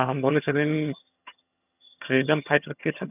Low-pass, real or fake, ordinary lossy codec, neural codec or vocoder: 3.6 kHz; fake; none; vocoder, 22.05 kHz, 80 mel bands, HiFi-GAN